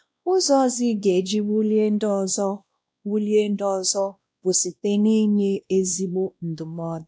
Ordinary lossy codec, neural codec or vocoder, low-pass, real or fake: none; codec, 16 kHz, 1 kbps, X-Codec, WavLM features, trained on Multilingual LibriSpeech; none; fake